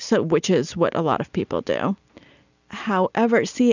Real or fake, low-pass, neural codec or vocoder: real; 7.2 kHz; none